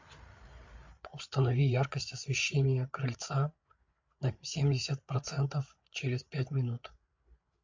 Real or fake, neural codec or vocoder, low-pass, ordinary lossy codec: fake; codec, 16 kHz, 8 kbps, FreqCodec, larger model; 7.2 kHz; MP3, 48 kbps